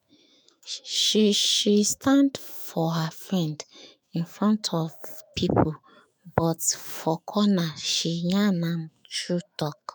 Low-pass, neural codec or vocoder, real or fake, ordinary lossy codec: none; autoencoder, 48 kHz, 128 numbers a frame, DAC-VAE, trained on Japanese speech; fake; none